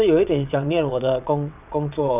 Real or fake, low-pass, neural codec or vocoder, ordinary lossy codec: fake; 3.6 kHz; vocoder, 22.05 kHz, 80 mel bands, WaveNeXt; none